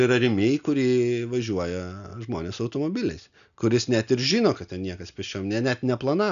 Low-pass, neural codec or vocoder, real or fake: 7.2 kHz; none; real